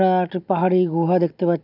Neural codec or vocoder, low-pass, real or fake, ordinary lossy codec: none; 5.4 kHz; real; none